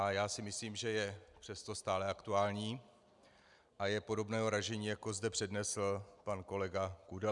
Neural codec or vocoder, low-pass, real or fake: none; 10.8 kHz; real